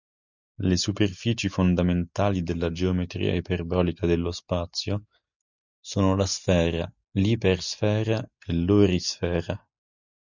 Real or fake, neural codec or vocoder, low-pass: real; none; 7.2 kHz